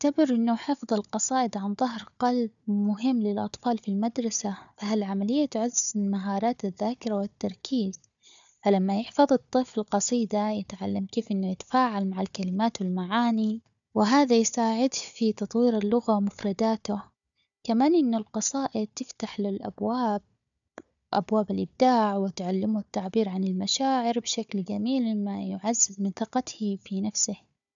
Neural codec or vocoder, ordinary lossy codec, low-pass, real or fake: codec, 16 kHz, 4 kbps, FunCodec, trained on Chinese and English, 50 frames a second; none; 7.2 kHz; fake